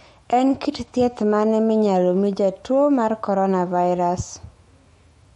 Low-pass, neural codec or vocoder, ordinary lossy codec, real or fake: 19.8 kHz; autoencoder, 48 kHz, 128 numbers a frame, DAC-VAE, trained on Japanese speech; MP3, 48 kbps; fake